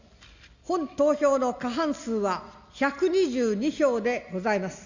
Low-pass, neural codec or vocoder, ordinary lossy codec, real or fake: 7.2 kHz; none; Opus, 64 kbps; real